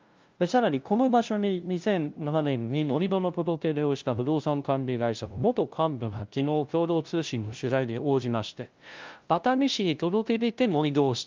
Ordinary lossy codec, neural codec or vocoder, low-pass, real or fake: Opus, 24 kbps; codec, 16 kHz, 0.5 kbps, FunCodec, trained on LibriTTS, 25 frames a second; 7.2 kHz; fake